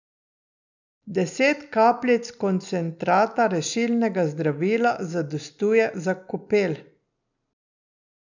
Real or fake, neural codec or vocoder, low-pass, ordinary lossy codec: real; none; 7.2 kHz; none